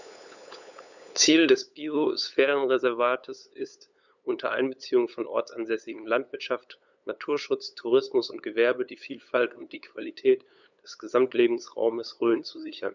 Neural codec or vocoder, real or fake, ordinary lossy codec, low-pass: codec, 16 kHz, 8 kbps, FunCodec, trained on LibriTTS, 25 frames a second; fake; none; 7.2 kHz